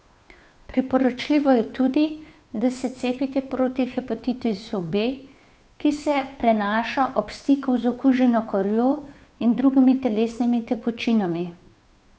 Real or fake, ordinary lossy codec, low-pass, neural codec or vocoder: fake; none; none; codec, 16 kHz, 2 kbps, FunCodec, trained on Chinese and English, 25 frames a second